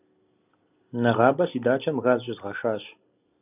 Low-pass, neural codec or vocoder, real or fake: 3.6 kHz; none; real